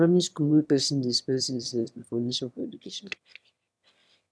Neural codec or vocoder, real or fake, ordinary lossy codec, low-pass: autoencoder, 22.05 kHz, a latent of 192 numbers a frame, VITS, trained on one speaker; fake; none; none